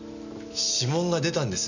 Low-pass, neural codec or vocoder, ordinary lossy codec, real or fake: 7.2 kHz; none; none; real